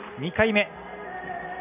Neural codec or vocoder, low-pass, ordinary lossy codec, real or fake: none; 3.6 kHz; none; real